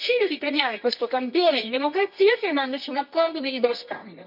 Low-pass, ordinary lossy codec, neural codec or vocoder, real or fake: 5.4 kHz; none; codec, 24 kHz, 0.9 kbps, WavTokenizer, medium music audio release; fake